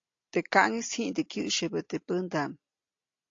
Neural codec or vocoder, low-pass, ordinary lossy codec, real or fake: none; 7.2 kHz; AAC, 48 kbps; real